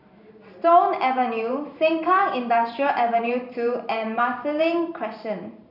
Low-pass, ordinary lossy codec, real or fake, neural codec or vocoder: 5.4 kHz; none; fake; vocoder, 44.1 kHz, 128 mel bands every 512 samples, BigVGAN v2